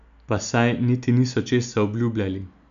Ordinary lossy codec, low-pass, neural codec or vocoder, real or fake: none; 7.2 kHz; none; real